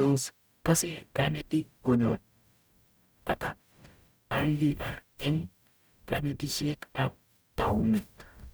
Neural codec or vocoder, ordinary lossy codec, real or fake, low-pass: codec, 44.1 kHz, 0.9 kbps, DAC; none; fake; none